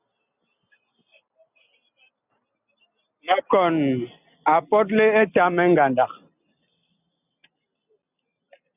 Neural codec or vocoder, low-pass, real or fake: none; 3.6 kHz; real